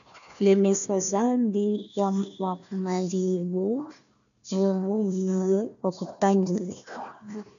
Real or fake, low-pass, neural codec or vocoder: fake; 7.2 kHz; codec, 16 kHz, 1 kbps, FunCodec, trained on Chinese and English, 50 frames a second